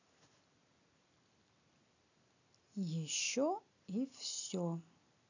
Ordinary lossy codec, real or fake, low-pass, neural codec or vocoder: none; real; 7.2 kHz; none